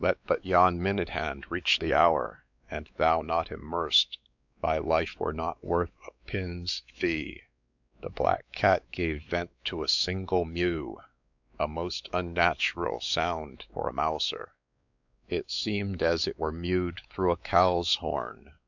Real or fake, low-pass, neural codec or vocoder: fake; 7.2 kHz; codec, 16 kHz, 4 kbps, X-Codec, WavLM features, trained on Multilingual LibriSpeech